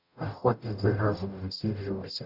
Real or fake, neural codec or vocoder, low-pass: fake; codec, 44.1 kHz, 0.9 kbps, DAC; 5.4 kHz